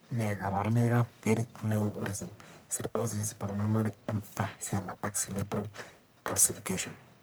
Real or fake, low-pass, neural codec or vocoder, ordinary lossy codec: fake; none; codec, 44.1 kHz, 1.7 kbps, Pupu-Codec; none